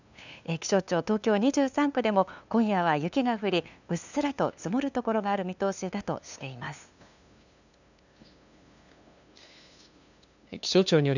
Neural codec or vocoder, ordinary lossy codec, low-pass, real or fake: codec, 16 kHz, 2 kbps, FunCodec, trained on LibriTTS, 25 frames a second; none; 7.2 kHz; fake